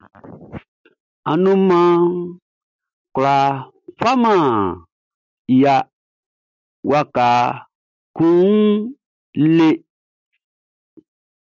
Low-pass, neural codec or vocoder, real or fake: 7.2 kHz; none; real